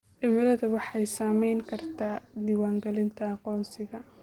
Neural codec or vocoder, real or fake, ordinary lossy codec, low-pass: none; real; Opus, 16 kbps; 19.8 kHz